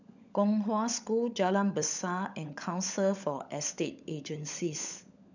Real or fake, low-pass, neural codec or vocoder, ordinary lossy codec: fake; 7.2 kHz; codec, 16 kHz, 16 kbps, FunCodec, trained on LibriTTS, 50 frames a second; none